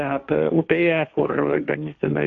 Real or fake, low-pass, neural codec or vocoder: fake; 7.2 kHz; codec, 16 kHz, 1.1 kbps, Voila-Tokenizer